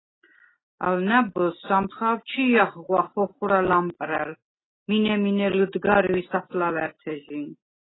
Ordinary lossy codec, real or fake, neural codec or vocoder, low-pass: AAC, 16 kbps; real; none; 7.2 kHz